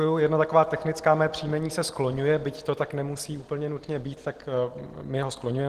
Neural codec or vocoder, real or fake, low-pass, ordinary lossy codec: none; real; 14.4 kHz; Opus, 16 kbps